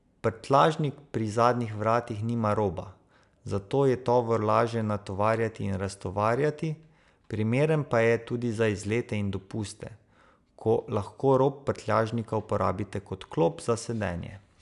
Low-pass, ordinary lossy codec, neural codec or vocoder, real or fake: 10.8 kHz; none; none; real